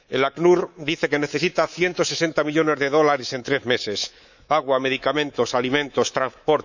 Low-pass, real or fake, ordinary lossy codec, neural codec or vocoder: 7.2 kHz; fake; none; codec, 24 kHz, 3.1 kbps, DualCodec